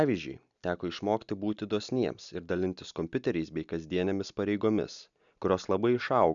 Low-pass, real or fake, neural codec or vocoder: 7.2 kHz; real; none